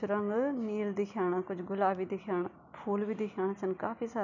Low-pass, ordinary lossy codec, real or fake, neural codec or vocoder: 7.2 kHz; none; real; none